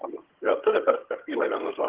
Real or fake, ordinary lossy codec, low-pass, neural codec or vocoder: fake; Opus, 16 kbps; 3.6 kHz; vocoder, 22.05 kHz, 80 mel bands, HiFi-GAN